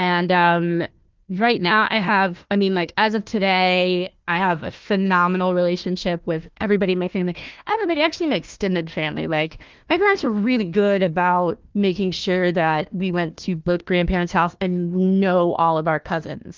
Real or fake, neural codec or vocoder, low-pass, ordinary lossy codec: fake; codec, 16 kHz, 1 kbps, FunCodec, trained on Chinese and English, 50 frames a second; 7.2 kHz; Opus, 32 kbps